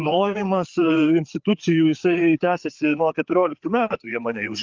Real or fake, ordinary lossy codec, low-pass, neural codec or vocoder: fake; Opus, 24 kbps; 7.2 kHz; codec, 16 kHz, 4 kbps, FreqCodec, larger model